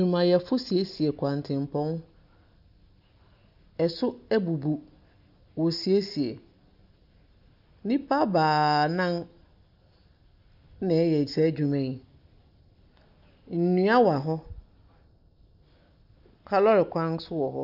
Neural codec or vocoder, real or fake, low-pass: none; real; 5.4 kHz